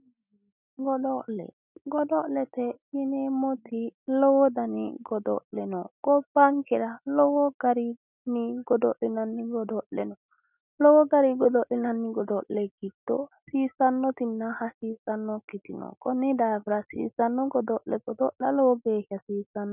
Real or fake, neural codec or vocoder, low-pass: real; none; 3.6 kHz